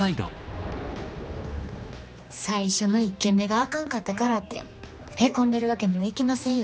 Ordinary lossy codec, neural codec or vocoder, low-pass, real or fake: none; codec, 16 kHz, 2 kbps, X-Codec, HuBERT features, trained on general audio; none; fake